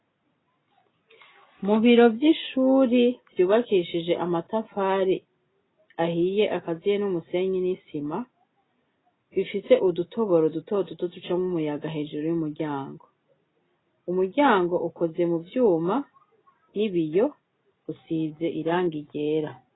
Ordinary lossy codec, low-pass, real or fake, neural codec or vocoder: AAC, 16 kbps; 7.2 kHz; real; none